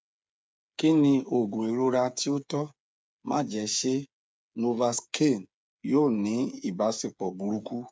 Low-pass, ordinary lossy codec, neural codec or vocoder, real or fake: none; none; codec, 16 kHz, 8 kbps, FreqCodec, smaller model; fake